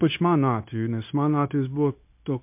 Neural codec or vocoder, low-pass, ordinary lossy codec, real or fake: codec, 16 kHz, 0.9 kbps, LongCat-Audio-Codec; 3.6 kHz; MP3, 32 kbps; fake